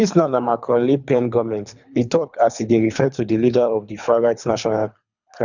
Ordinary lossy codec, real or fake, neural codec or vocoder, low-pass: none; fake; codec, 24 kHz, 3 kbps, HILCodec; 7.2 kHz